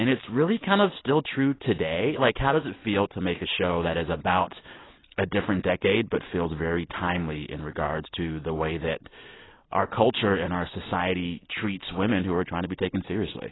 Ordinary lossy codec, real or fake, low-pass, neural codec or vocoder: AAC, 16 kbps; real; 7.2 kHz; none